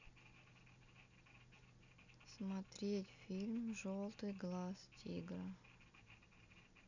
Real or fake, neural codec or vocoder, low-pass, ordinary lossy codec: real; none; 7.2 kHz; none